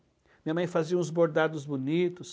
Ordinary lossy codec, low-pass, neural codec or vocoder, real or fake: none; none; none; real